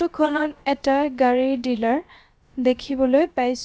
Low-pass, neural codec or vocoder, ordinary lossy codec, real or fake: none; codec, 16 kHz, about 1 kbps, DyCAST, with the encoder's durations; none; fake